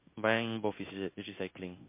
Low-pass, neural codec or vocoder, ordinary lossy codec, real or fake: 3.6 kHz; codec, 16 kHz in and 24 kHz out, 1 kbps, XY-Tokenizer; MP3, 24 kbps; fake